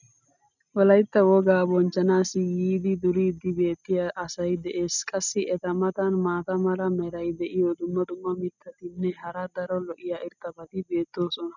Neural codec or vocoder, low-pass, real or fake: none; 7.2 kHz; real